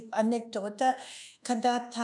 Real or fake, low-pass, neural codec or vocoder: fake; 10.8 kHz; codec, 24 kHz, 1.2 kbps, DualCodec